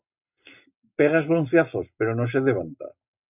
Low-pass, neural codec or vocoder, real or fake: 3.6 kHz; none; real